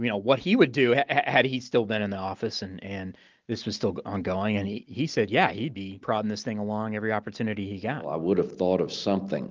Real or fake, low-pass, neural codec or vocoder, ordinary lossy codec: real; 7.2 kHz; none; Opus, 32 kbps